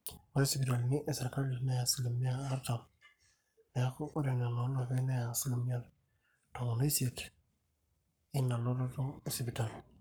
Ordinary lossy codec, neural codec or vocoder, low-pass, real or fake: none; codec, 44.1 kHz, 7.8 kbps, Pupu-Codec; none; fake